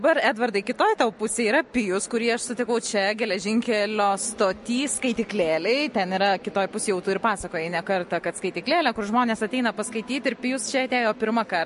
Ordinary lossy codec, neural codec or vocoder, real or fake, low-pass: MP3, 48 kbps; none; real; 14.4 kHz